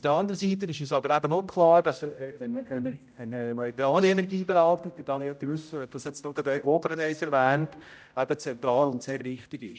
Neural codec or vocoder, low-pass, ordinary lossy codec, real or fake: codec, 16 kHz, 0.5 kbps, X-Codec, HuBERT features, trained on general audio; none; none; fake